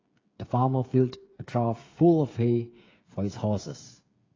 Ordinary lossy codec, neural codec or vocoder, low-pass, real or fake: AAC, 32 kbps; codec, 16 kHz, 8 kbps, FreqCodec, smaller model; 7.2 kHz; fake